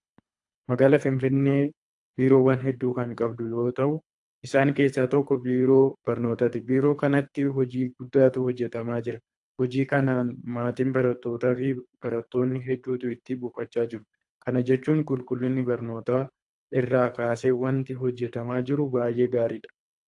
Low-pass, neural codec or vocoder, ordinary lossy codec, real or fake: 10.8 kHz; codec, 24 kHz, 3 kbps, HILCodec; AAC, 64 kbps; fake